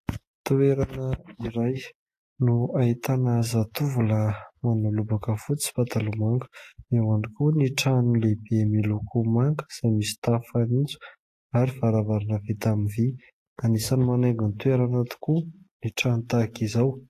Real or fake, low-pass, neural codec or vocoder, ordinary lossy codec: real; 14.4 kHz; none; AAC, 48 kbps